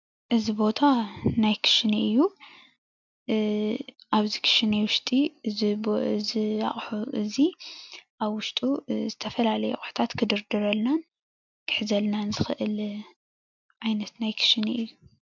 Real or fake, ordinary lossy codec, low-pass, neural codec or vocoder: real; MP3, 64 kbps; 7.2 kHz; none